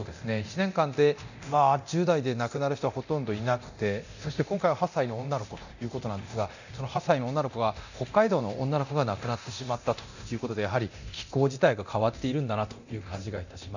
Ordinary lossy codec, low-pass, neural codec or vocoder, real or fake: none; 7.2 kHz; codec, 24 kHz, 0.9 kbps, DualCodec; fake